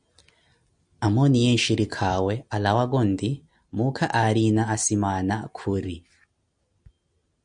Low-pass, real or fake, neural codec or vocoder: 9.9 kHz; real; none